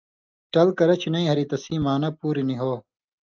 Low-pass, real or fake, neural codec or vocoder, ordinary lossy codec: 7.2 kHz; real; none; Opus, 24 kbps